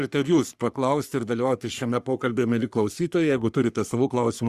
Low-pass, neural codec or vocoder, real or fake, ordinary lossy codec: 14.4 kHz; codec, 44.1 kHz, 3.4 kbps, Pupu-Codec; fake; Opus, 64 kbps